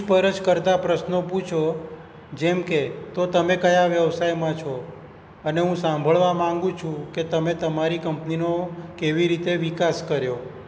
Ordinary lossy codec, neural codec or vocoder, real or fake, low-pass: none; none; real; none